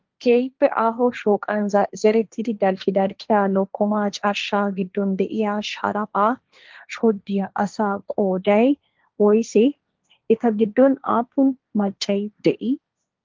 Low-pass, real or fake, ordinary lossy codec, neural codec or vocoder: 7.2 kHz; fake; Opus, 32 kbps; codec, 16 kHz, 1.1 kbps, Voila-Tokenizer